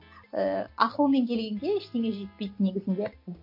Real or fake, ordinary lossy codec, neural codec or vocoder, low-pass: real; MP3, 32 kbps; none; 5.4 kHz